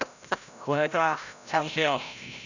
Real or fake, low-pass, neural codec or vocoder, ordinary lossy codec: fake; 7.2 kHz; codec, 16 kHz, 0.5 kbps, FreqCodec, larger model; none